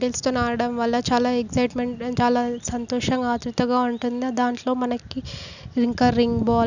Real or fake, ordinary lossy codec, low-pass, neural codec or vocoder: real; none; 7.2 kHz; none